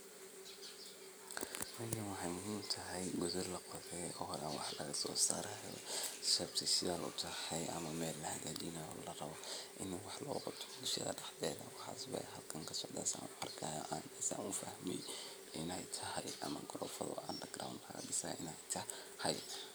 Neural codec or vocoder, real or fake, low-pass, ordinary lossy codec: none; real; none; none